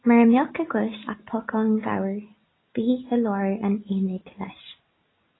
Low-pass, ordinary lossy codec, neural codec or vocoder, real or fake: 7.2 kHz; AAC, 16 kbps; none; real